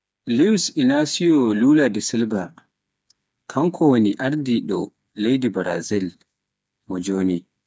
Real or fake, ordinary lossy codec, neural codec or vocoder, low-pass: fake; none; codec, 16 kHz, 4 kbps, FreqCodec, smaller model; none